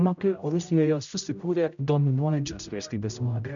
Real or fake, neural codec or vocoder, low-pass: fake; codec, 16 kHz, 0.5 kbps, X-Codec, HuBERT features, trained on general audio; 7.2 kHz